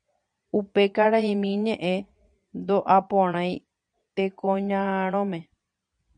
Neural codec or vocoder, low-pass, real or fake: vocoder, 22.05 kHz, 80 mel bands, Vocos; 9.9 kHz; fake